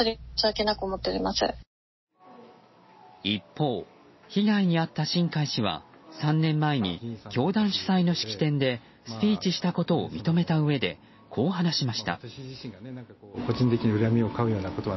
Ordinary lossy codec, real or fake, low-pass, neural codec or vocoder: MP3, 24 kbps; real; 7.2 kHz; none